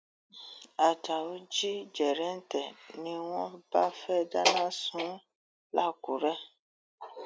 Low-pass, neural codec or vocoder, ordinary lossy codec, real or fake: none; none; none; real